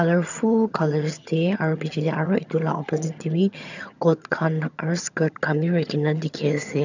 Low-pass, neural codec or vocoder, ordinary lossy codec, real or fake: 7.2 kHz; vocoder, 22.05 kHz, 80 mel bands, HiFi-GAN; none; fake